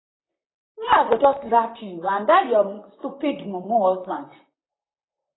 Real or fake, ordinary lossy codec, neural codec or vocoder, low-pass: fake; AAC, 16 kbps; vocoder, 44.1 kHz, 128 mel bands, Pupu-Vocoder; 7.2 kHz